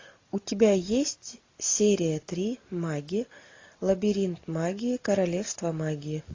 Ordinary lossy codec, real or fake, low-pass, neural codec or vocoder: AAC, 32 kbps; real; 7.2 kHz; none